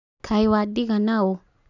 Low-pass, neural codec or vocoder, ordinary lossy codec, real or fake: 7.2 kHz; none; none; real